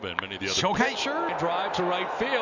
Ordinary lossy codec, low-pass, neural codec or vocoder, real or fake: none; 7.2 kHz; none; real